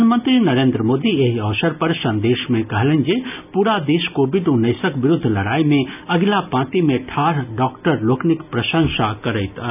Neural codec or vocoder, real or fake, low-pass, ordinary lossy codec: none; real; 3.6 kHz; none